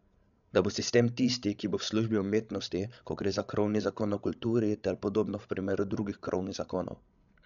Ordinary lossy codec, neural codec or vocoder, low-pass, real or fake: none; codec, 16 kHz, 16 kbps, FreqCodec, larger model; 7.2 kHz; fake